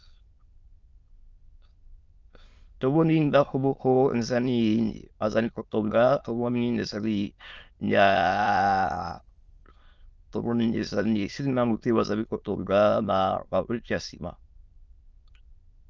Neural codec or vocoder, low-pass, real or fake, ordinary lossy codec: autoencoder, 22.05 kHz, a latent of 192 numbers a frame, VITS, trained on many speakers; 7.2 kHz; fake; Opus, 24 kbps